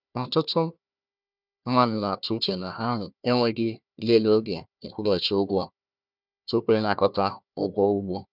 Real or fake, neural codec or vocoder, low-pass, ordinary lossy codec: fake; codec, 16 kHz, 1 kbps, FunCodec, trained on Chinese and English, 50 frames a second; 5.4 kHz; none